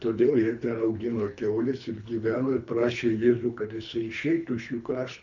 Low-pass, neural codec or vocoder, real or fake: 7.2 kHz; codec, 24 kHz, 3 kbps, HILCodec; fake